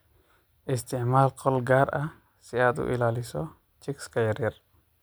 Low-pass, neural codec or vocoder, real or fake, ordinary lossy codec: none; none; real; none